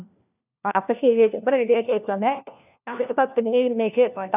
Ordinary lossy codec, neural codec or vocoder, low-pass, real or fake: none; codec, 16 kHz, 1 kbps, FunCodec, trained on LibriTTS, 50 frames a second; 3.6 kHz; fake